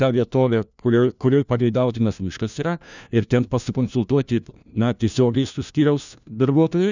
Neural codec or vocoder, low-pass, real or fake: codec, 16 kHz, 1 kbps, FunCodec, trained on LibriTTS, 50 frames a second; 7.2 kHz; fake